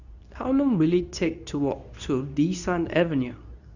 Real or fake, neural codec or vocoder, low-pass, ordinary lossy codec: fake; codec, 24 kHz, 0.9 kbps, WavTokenizer, medium speech release version 2; 7.2 kHz; none